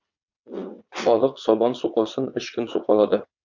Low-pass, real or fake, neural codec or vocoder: 7.2 kHz; fake; vocoder, 22.05 kHz, 80 mel bands, WaveNeXt